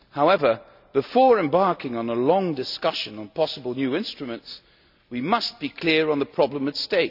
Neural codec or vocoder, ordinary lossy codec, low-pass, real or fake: none; none; 5.4 kHz; real